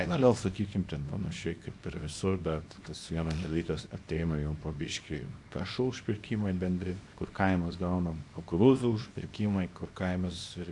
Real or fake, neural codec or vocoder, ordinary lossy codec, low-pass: fake; codec, 24 kHz, 0.9 kbps, WavTokenizer, small release; AAC, 64 kbps; 10.8 kHz